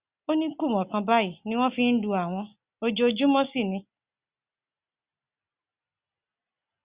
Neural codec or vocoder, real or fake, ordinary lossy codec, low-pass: none; real; Opus, 64 kbps; 3.6 kHz